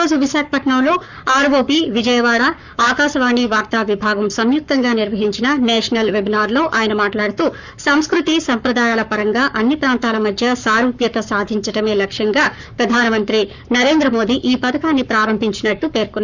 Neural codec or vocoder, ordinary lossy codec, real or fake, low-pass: codec, 44.1 kHz, 7.8 kbps, Pupu-Codec; none; fake; 7.2 kHz